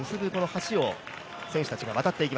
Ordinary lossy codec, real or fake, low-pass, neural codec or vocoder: none; real; none; none